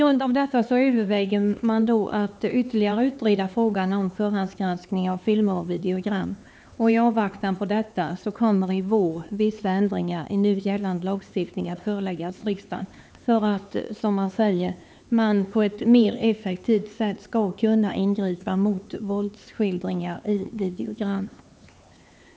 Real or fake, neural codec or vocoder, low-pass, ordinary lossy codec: fake; codec, 16 kHz, 4 kbps, X-Codec, HuBERT features, trained on LibriSpeech; none; none